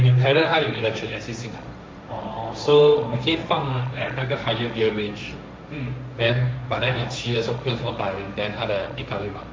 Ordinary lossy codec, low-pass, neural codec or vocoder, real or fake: none; none; codec, 16 kHz, 1.1 kbps, Voila-Tokenizer; fake